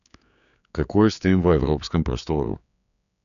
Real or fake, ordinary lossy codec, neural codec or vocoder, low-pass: fake; none; codec, 16 kHz, 4 kbps, X-Codec, HuBERT features, trained on general audio; 7.2 kHz